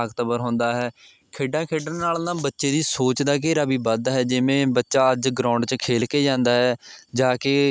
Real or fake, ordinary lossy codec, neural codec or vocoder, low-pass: real; none; none; none